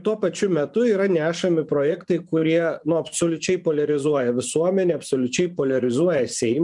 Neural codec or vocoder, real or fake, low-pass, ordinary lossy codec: vocoder, 24 kHz, 100 mel bands, Vocos; fake; 10.8 kHz; MP3, 96 kbps